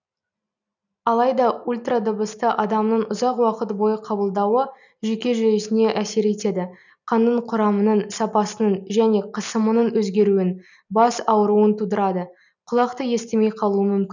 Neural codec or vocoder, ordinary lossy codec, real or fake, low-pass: none; none; real; 7.2 kHz